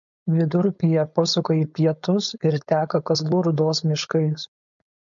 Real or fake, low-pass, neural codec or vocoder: fake; 7.2 kHz; codec, 16 kHz, 4.8 kbps, FACodec